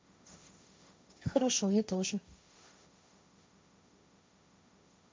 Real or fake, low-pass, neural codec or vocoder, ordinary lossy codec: fake; none; codec, 16 kHz, 1.1 kbps, Voila-Tokenizer; none